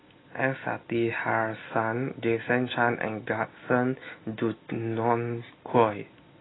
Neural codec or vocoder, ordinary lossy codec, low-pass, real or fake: none; AAC, 16 kbps; 7.2 kHz; real